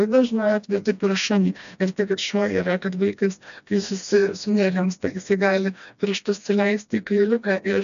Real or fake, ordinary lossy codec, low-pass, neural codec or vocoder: fake; AAC, 96 kbps; 7.2 kHz; codec, 16 kHz, 1 kbps, FreqCodec, smaller model